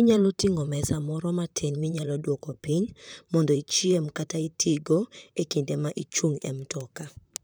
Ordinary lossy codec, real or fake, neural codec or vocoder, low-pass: none; fake; vocoder, 44.1 kHz, 128 mel bands, Pupu-Vocoder; none